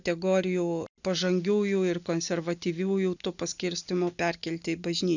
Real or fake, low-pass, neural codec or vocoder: real; 7.2 kHz; none